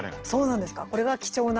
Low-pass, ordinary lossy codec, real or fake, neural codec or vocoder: 7.2 kHz; Opus, 16 kbps; real; none